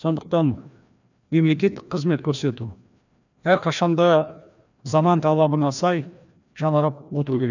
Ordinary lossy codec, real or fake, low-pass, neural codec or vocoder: none; fake; 7.2 kHz; codec, 16 kHz, 1 kbps, FreqCodec, larger model